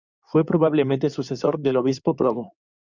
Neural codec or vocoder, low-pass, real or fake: codec, 16 kHz in and 24 kHz out, 2.2 kbps, FireRedTTS-2 codec; 7.2 kHz; fake